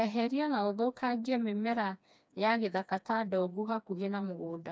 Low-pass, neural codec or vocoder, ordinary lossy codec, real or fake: none; codec, 16 kHz, 2 kbps, FreqCodec, smaller model; none; fake